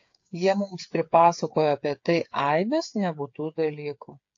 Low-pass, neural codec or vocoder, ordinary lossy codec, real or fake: 7.2 kHz; codec, 16 kHz, 8 kbps, FreqCodec, smaller model; AAC, 48 kbps; fake